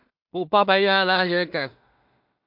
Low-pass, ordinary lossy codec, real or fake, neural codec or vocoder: 5.4 kHz; MP3, 48 kbps; fake; codec, 16 kHz in and 24 kHz out, 0.4 kbps, LongCat-Audio-Codec, two codebook decoder